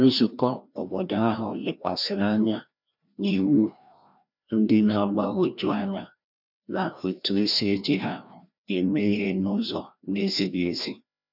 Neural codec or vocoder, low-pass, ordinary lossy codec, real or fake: codec, 16 kHz, 1 kbps, FreqCodec, larger model; 5.4 kHz; none; fake